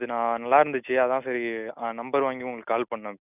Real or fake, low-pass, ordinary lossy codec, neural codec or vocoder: real; 3.6 kHz; none; none